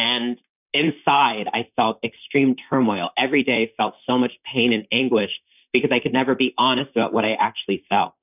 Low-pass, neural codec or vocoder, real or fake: 3.6 kHz; vocoder, 44.1 kHz, 128 mel bands, Pupu-Vocoder; fake